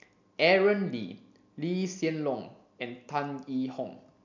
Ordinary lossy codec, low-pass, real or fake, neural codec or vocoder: MP3, 64 kbps; 7.2 kHz; real; none